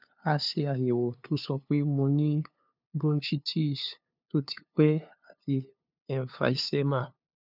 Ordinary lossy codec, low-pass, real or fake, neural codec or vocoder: none; 5.4 kHz; fake; codec, 16 kHz, 2 kbps, FunCodec, trained on LibriTTS, 25 frames a second